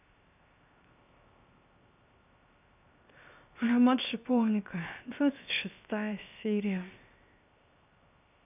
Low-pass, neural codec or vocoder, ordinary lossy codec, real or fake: 3.6 kHz; codec, 16 kHz, 0.7 kbps, FocalCodec; none; fake